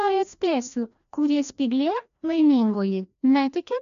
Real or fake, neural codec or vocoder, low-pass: fake; codec, 16 kHz, 1 kbps, FreqCodec, larger model; 7.2 kHz